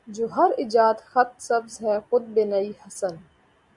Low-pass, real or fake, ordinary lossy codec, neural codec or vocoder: 10.8 kHz; real; Opus, 64 kbps; none